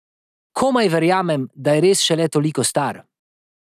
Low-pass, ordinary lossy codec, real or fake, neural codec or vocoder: 14.4 kHz; none; real; none